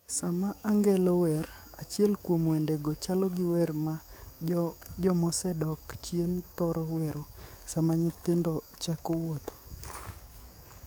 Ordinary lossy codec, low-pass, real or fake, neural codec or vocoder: none; none; fake; codec, 44.1 kHz, 7.8 kbps, DAC